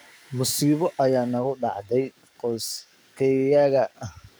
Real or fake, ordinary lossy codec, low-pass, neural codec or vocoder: fake; none; none; codec, 44.1 kHz, 7.8 kbps, DAC